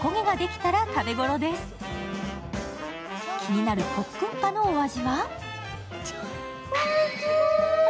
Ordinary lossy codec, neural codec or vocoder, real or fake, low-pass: none; none; real; none